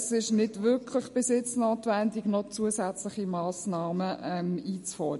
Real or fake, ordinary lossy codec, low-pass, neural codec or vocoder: real; MP3, 48 kbps; 14.4 kHz; none